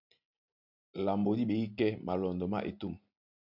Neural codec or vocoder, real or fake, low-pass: none; real; 5.4 kHz